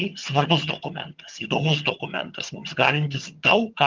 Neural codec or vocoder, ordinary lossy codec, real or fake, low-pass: vocoder, 22.05 kHz, 80 mel bands, HiFi-GAN; Opus, 32 kbps; fake; 7.2 kHz